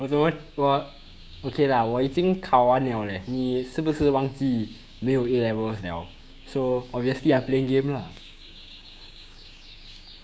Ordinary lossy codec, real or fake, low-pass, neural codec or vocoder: none; fake; none; codec, 16 kHz, 6 kbps, DAC